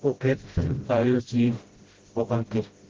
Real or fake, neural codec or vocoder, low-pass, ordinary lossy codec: fake; codec, 16 kHz, 0.5 kbps, FreqCodec, smaller model; 7.2 kHz; Opus, 16 kbps